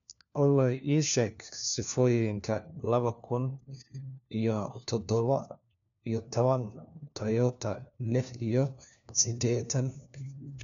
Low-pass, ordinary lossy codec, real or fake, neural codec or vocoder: 7.2 kHz; none; fake; codec, 16 kHz, 1 kbps, FunCodec, trained on LibriTTS, 50 frames a second